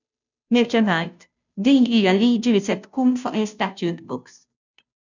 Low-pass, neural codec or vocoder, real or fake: 7.2 kHz; codec, 16 kHz, 0.5 kbps, FunCodec, trained on Chinese and English, 25 frames a second; fake